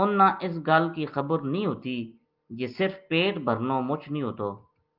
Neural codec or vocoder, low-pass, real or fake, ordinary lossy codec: none; 5.4 kHz; real; Opus, 32 kbps